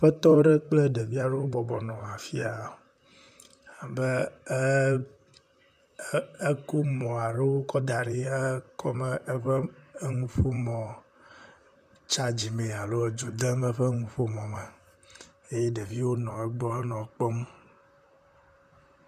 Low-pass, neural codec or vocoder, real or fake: 14.4 kHz; vocoder, 44.1 kHz, 128 mel bands, Pupu-Vocoder; fake